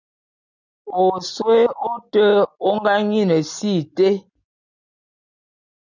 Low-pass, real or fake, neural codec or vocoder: 7.2 kHz; real; none